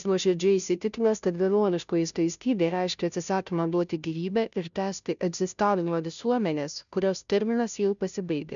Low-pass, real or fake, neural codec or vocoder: 7.2 kHz; fake; codec, 16 kHz, 0.5 kbps, FunCodec, trained on Chinese and English, 25 frames a second